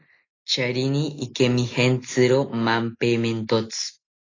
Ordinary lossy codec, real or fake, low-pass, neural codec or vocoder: AAC, 32 kbps; real; 7.2 kHz; none